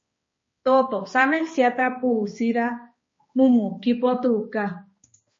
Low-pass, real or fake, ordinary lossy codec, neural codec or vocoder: 7.2 kHz; fake; MP3, 32 kbps; codec, 16 kHz, 2 kbps, X-Codec, HuBERT features, trained on balanced general audio